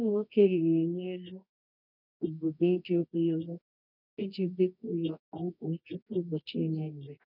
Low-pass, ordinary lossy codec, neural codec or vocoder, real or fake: 5.4 kHz; none; codec, 24 kHz, 0.9 kbps, WavTokenizer, medium music audio release; fake